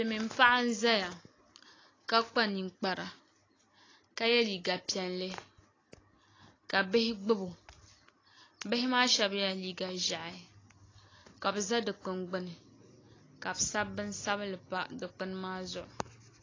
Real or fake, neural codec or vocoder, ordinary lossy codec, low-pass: real; none; AAC, 32 kbps; 7.2 kHz